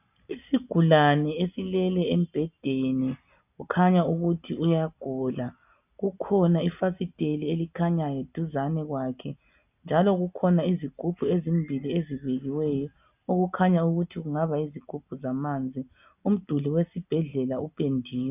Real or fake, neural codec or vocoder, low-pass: real; none; 3.6 kHz